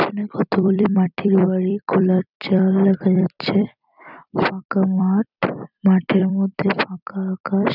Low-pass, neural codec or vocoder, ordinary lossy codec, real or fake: 5.4 kHz; vocoder, 44.1 kHz, 128 mel bands every 256 samples, BigVGAN v2; none; fake